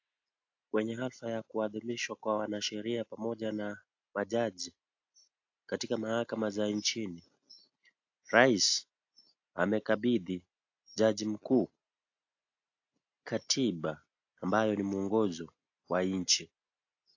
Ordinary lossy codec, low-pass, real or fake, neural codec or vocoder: AAC, 48 kbps; 7.2 kHz; real; none